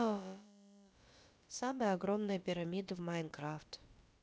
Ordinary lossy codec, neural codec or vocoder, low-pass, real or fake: none; codec, 16 kHz, about 1 kbps, DyCAST, with the encoder's durations; none; fake